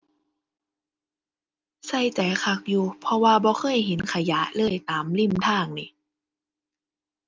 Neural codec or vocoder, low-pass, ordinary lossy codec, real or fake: none; 7.2 kHz; Opus, 24 kbps; real